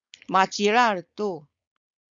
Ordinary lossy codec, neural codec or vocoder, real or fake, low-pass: Opus, 64 kbps; codec, 16 kHz, 4.8 kbps, FACodec; fake; 7.2 kHz